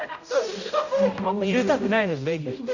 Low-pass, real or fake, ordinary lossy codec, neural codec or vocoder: 7.2 kHz; fake; none; codec, 16 kHz, 0.5 kbps, X-Codec, HuBERT features, trained on general audio